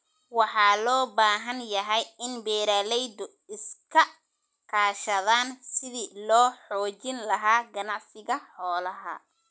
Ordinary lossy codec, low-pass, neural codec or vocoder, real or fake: none; none; none; real